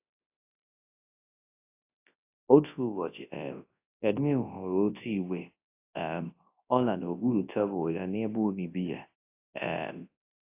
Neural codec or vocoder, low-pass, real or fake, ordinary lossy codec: codec, 24 kHz, 0.9 kbps, WavTokenizer, large speech release; 3.6 kHz; fake; AAC, 24 kbps